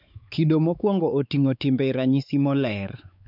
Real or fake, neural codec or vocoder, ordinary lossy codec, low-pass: fake; codec, 16 kHz, 4 kbps, X-Codec, WavLM features, trained on Multilingual LibriSpeech; none; 5.4 kHz